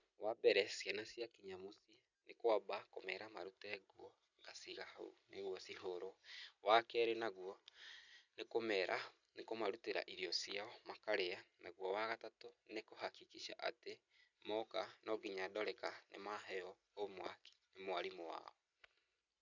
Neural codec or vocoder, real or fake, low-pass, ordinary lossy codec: none; real; 7.2 kHz; none